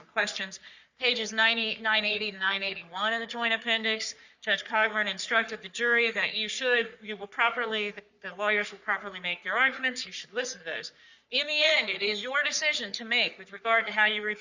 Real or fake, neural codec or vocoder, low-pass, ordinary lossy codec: fake; codec, 44.1 kHz, 3.4 kbps, Pupu-Codec; 7.2 kHz; Opus, 64 kbps